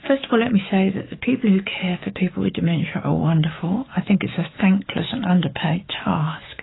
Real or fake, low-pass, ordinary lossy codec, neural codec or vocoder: fake; 7.2 kHz; AAC, 16 kbps; autoencoder, 48 kHz, 128 numbers a frame, DAC-VAE, trained on Japanese speech